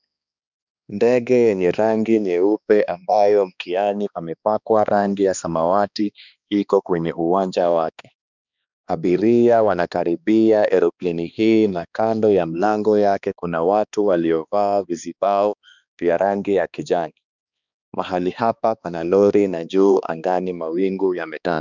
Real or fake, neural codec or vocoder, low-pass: fake; codec, 16 kHz, 2 kbps, X-Codec, HuBERT features, trained on balanced general audio; 7.2 kHz